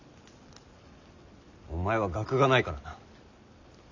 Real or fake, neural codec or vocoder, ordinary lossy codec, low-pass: real; none; none; 7.2 kHz